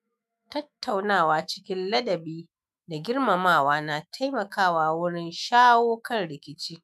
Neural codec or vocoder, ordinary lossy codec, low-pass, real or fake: autoencoder, 48 kHz, 128 numbers a frame, DAC-VAE, trained on Japanese speech; none; 14.4 kHz; fake